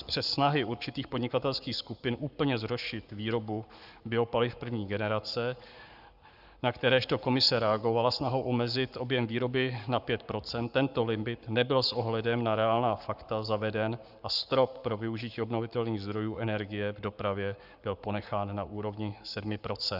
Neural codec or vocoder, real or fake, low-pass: codec, 44.1 kHz, 7.8 kbps, Pupu-Codec; fake; 5.4 kHz